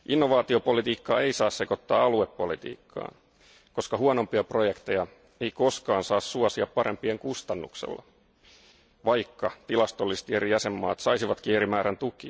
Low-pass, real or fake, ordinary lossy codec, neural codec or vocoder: none; real; none; none